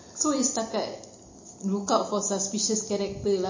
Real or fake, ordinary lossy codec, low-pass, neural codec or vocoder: real; MP3, 32 kbps; 7.2 kHz; none